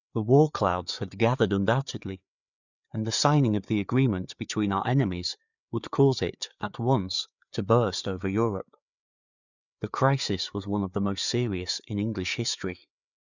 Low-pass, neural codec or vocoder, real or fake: 7.2 kHz; codec, 16 kHz, 4 kbps, FreqCodec, larger model; fake